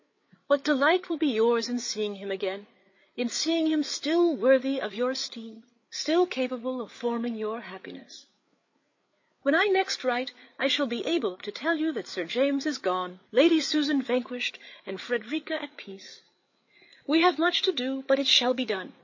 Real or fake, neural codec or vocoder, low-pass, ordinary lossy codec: fake; codec, 16 kHz, 8 kbps, FreqCodec, larger model; 7.2 kHz; MP3, 32 kbps